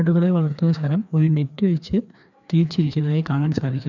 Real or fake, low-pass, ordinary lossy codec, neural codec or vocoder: fake; 7.2 kHz; none; codec, 16 kHz, 2 kbps, FreqCodec, larger model